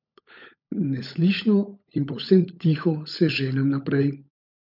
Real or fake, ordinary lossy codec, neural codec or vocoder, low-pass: fake; none; codec, 16 kHz, 16 kbps, FunCodec, trained on LibriTTS, 50 frames a second; 5.4 kHz